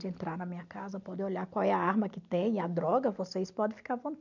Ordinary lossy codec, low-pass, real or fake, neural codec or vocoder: none; 7.2 kHz; real; none